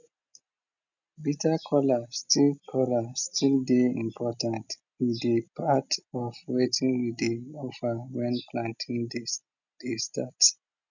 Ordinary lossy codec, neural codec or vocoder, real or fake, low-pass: none; none; real; 7.2 kHz